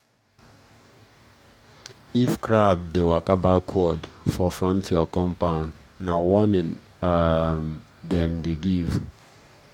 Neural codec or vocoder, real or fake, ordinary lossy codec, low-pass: codec, 44.1 kHz, 2.6 kbps, DAC; fake; MP3, 96 kbps; 19.8 kHz